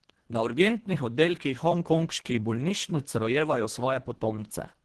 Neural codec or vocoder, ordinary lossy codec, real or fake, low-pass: codec, 24 kHz, 1.5 kbps, HILCodec; Opus, 16 kbps; fake; 10.8 kHz